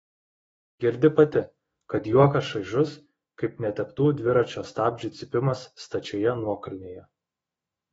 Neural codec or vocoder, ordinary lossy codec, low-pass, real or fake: autoencoder, 48 kHz, 128 numbers a frame, DAC-VAE, trained on Japanese speech; AAC, 24 kbps; 19.8 kHz; fake